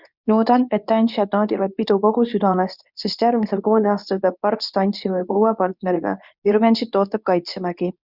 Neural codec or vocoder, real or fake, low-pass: codec, 24 kHz, 0.9 kbps, WavTokenizer, medium speech release version 2; fake; 5.4 kHz